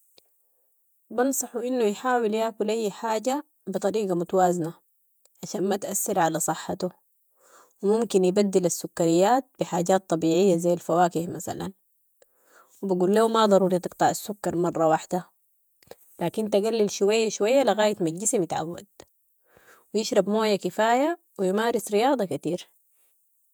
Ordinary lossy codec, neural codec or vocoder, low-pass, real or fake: none; vocoder, 48 kHz, 128 mel bands, Vocos; none; fake